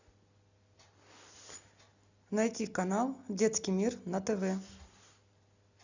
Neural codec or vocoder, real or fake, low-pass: none; real; 7.2 kHz